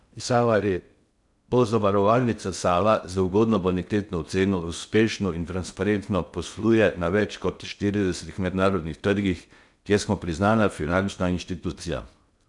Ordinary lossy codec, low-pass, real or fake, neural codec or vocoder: none; 10.8 kHz; fake; codec, 16 kHz in and 24 kHz out, 0.6 kbps, FocalCodec, streaming, 2048 codes